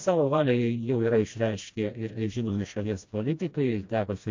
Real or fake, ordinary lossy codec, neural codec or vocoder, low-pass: fake; MP3, 48 kbps; codec, 16 kHz, 1 kbps, FreqCodec, smaller model; 7.2 kHz